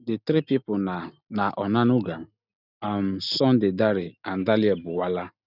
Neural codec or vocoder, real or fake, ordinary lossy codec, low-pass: none; real; none; 5.4 kHz